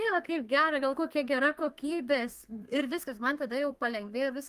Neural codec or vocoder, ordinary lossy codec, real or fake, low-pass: codec, 32 kHz, 1.9 kbps, SNAC; Opus, 32 kbps; fake; 14.4 kHz